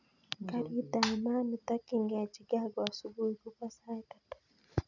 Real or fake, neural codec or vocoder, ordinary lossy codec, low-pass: real; none; none; 7.2 kHz